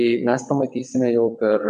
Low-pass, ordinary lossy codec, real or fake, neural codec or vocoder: 9.9 kHz; MP3, 64 kbps; fake; vocoder, 22.05 kHz, 80 mel bands, WaveNeXt